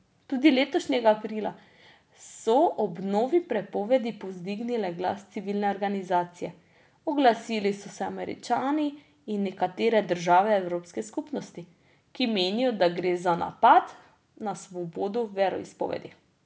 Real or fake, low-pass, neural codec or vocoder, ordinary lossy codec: real; none; none; none